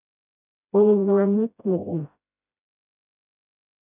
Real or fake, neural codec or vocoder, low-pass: fake; codec, 16 kHz, 0.5 kbps, FreqCodec, smaller model; 3.6 kHz